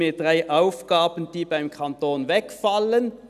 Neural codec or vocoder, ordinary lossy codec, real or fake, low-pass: none; none; real; 14.4 kHz